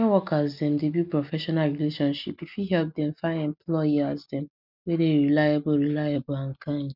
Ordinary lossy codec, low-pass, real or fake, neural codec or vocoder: none; 5.4 kHz; real; none